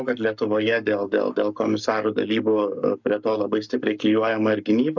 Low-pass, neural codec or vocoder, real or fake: 7.2 kHz; codec, 44.1 kHz, 7.8 kbps, Pupu-Codec; fake